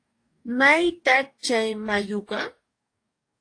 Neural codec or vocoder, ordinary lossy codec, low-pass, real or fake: codec, 44.1 kHz, 2.6 kbps, DAC; AAC, 48 kbps; 9.9 kHz; fake